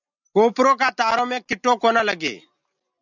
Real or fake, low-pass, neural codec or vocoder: real; 7.2 kHz; none